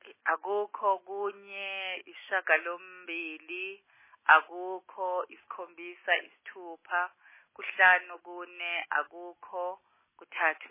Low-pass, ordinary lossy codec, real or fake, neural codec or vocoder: 3.6 kHz; MP3, 16 kbps; real; none